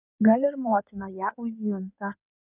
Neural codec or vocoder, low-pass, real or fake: codec, 16 kHz in and 24 kHz out, 2.2 kbps, FireRedTTS-2 codec; 3.6 kHz; fake